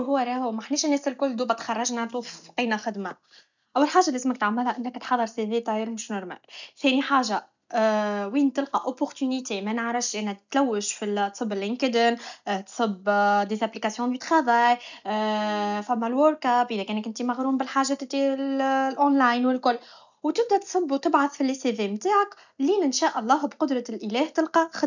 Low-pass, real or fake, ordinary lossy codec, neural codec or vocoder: 7.2 kHz; real; none; none